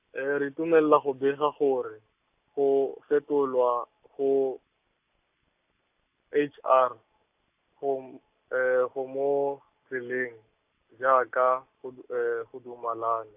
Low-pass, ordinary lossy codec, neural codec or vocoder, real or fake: 3.6 kHz; AAC, 32 kbps; none; real